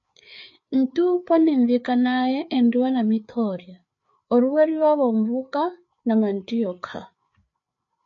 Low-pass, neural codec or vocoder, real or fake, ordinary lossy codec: 7.2 kHz; codec, 16 kHz, 4 kbps, FreqCodec, larger model; fake; MP3, 48 kbps